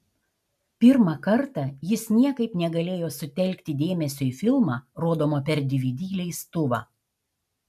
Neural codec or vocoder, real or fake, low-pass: none; real; 14.4 kHz